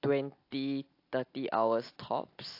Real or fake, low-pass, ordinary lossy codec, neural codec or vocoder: real; 5.4 kHz; AAC, 32 kbps; none